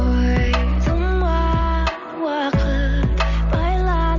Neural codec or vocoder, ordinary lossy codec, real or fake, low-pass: none; none; real; 7.2 kHz